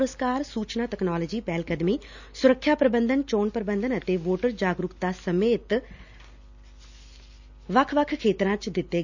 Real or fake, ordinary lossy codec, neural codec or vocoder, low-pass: real; none; none; 7.2 kHz